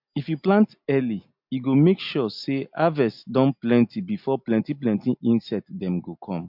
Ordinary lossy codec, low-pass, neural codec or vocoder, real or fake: MP3, 48 kbps; 5.4 kHz; none; real